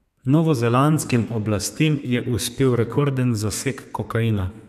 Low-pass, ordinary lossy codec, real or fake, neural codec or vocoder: 14.4 kHz; none; fake; codec, 32 kHz, 1.9 kbps, SNAC